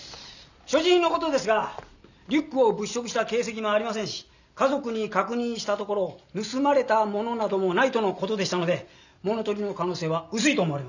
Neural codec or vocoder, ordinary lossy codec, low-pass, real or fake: none; none; 7.2 kHz; real